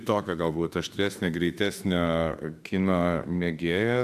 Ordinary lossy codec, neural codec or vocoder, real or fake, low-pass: AAC, 96 kbps; autoencoder, 48 kHz, 32 numbers a frame, DAC-VAE, trained on Japanese speech; fake; 14.4 kHz